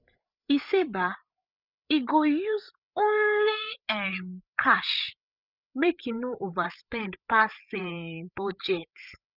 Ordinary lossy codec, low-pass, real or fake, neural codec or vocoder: none; 5.4 kHz; fake; codec, 16 kHz, 8 kbps, FreqCodec, larger model